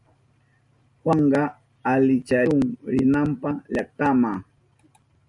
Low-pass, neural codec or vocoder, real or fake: 10.8 kHz; none; real